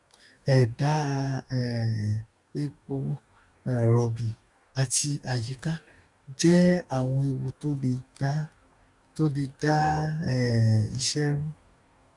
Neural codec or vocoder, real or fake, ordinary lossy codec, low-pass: codec, 44.1 kHz, 2.6 kbps, DAC; fake; none; 10.8 kHz